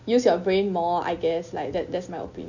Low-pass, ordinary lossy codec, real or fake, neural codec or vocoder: 7.2 kHz; none; real; none